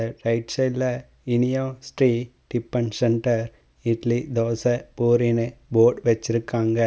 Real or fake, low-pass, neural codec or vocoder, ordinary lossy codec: real; none; none; none